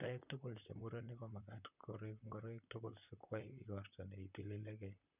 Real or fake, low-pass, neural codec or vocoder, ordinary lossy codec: fake; 3.6 kHz; vocoder, 44.1 kHz, 128 mel bands, Pupu-Vocoder; none